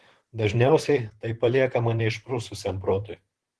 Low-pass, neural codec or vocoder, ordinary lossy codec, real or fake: 10.8 kHz; vocoder, 44.1 kHz, 128 mel bands, Pupu-Vocoder; Opus, 16 kbps; fake